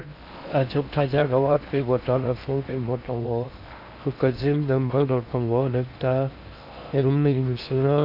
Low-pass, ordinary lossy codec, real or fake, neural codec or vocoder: 5.4 kHz; none; fake; codec, 16 kHz in and 24 kHz out, 0.6 kbps, FocalCodec, streaming, 4096 codes